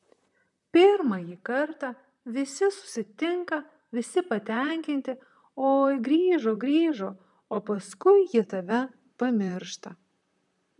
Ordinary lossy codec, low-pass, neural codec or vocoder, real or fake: MP3, 96 kbps; 10.8 kHz; vocoder, 44.1 kHz, 128 mel bands, Pupu-Vocoder; fake